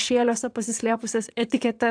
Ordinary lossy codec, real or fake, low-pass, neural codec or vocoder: AAC, 64 kbps; fake; 9.9 kHz; vocoder, 22.05 kHz, 80 mel bands, WaveNeXt